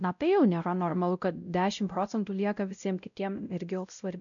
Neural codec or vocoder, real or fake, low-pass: codec, 16 kHz, 0.5 kbps, X-Codec, WavLM features, trained on Multilingual LibriSpeech; fake; 7.2 kHz